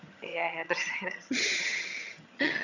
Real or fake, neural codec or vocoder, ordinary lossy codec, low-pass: fake; vocoder, 22.05 kHz, 80 mel bands, HiFi-GAN; none; 7.2 kHz